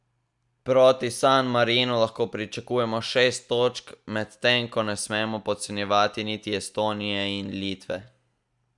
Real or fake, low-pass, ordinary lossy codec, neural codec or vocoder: real; 10.8 kHz; none; none